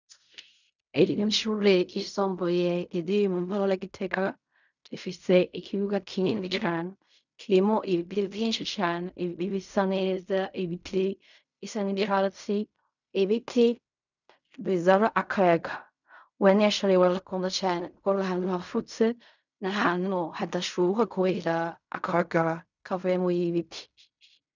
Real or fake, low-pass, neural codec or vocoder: fake; 7.2 kHz; codec, 16 kHz in and 24 kHz out, 0.4 kbps, LongCat-Audio-Codec, fine tuned four codebook decoder